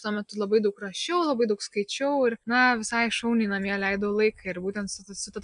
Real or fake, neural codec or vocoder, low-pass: real; none; 9.9 kHz